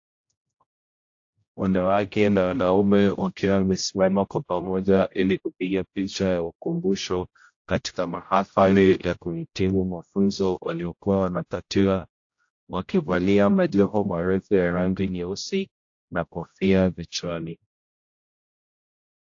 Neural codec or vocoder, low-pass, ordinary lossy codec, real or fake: codec, 16 kHz, 0.5 kbps, X-Codec, HuBERT features, trained on general audio; 7.2 kHz; AAC, 48 kbps; fake